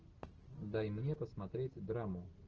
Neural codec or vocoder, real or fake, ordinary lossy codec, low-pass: none; real; Opus, 16 kbps; 7.2 kHz